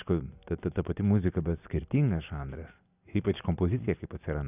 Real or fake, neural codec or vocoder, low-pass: real; none; 3.6 kHz